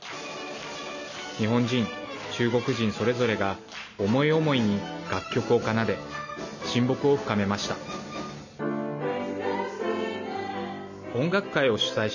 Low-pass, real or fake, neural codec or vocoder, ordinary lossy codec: 7.2 kHz; real; none; none